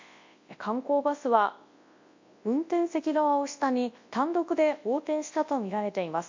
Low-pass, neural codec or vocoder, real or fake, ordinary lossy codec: 7.2 kHz; codec, 24 kHz, 0.9 kbps, WavTokenizer, large speech release; fake; MP3, 48 kbps